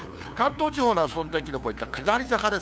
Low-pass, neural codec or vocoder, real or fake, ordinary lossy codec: none; codec, 16 kHz, 2 kbps, FunCodec, trained on LibriTTS, 25 frames a second; fake; none